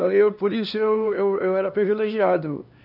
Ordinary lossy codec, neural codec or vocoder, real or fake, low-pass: none; codec, 16 kHz, 2 kbps, X-Codec, HuBERT features, trained on LibriSpeech; fake; 5.4 kHz